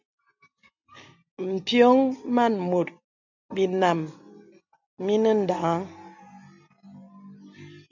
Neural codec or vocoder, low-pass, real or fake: none; 7.2 kHz; real